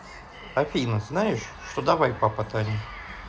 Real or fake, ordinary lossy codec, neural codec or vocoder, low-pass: real; none; none; none